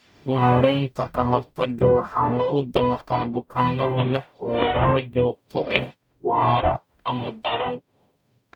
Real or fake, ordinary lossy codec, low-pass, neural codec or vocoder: fake; none; 19.8 kHz; codec, 44.1 kHz, 0.9 kbps, DAC